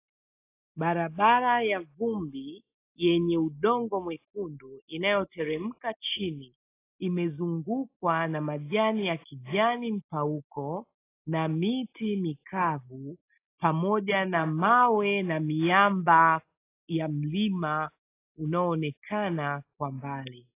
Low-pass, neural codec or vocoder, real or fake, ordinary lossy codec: 3.6 kHz; none; real; AAC, 24 kbps